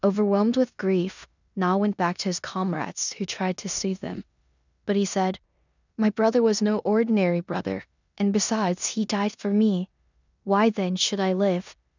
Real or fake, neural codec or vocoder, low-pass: fake; codec, 16 kHz in and 24 kHz out, 0.9 kbps, LongCat-Audio-Codec, four codebook decoder; 7.2 kHz